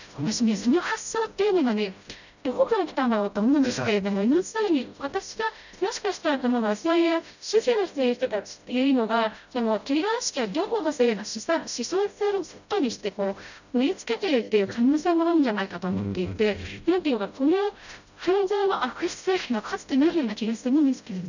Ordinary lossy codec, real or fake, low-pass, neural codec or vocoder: none; fake; 7.2 kHz; codec, 16 kHz, 0.5 kbps, FreqCodec, smaller model